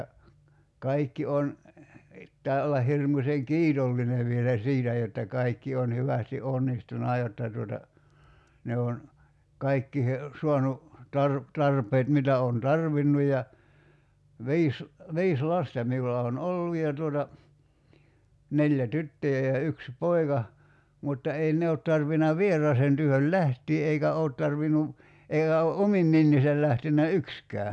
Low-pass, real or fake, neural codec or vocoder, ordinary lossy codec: none; real; none; none